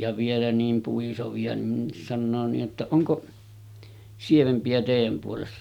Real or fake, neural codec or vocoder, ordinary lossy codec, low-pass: real; none; none; 19.8 kHz